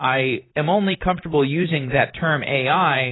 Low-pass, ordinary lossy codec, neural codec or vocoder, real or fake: 7.2 kHz; AAC, 16 kbps; vocoder, 44.1 kHz, 128 mel bands, Pupu-Vocoder; fake